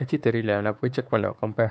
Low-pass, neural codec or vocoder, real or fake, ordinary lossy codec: none; codec, 16 kHz, 4 kbps, X-Codec, WavLM features, trained on Multilingual LibriSpeech; fake; none